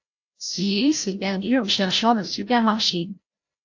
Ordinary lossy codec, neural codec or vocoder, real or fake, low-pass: AAC, 48 kbps; codec, 16 kHz, 0.5 kbps, FreqCodec, larger model; fake; 7.2 kHz